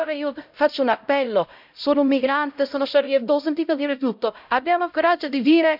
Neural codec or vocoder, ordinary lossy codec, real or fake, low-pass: codec, 16 kHz, 0.5 kbps, X-Codec, HuBERT features, trained on LibriSpeech; none; fake; 5.4 kHz